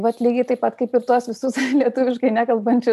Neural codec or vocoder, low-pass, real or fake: none; 14.4 kHz; real